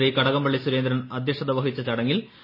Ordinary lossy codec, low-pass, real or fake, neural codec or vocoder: MP3, 24 kbps; 5.4 kHz; real; none